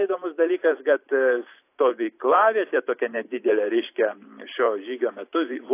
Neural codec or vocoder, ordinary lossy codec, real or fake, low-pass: vocoder, 44.1 kHz, 128 mel bands every 512 samples, BigVGAN v2; AAC, 24 kbps; fake; 3.6 kHz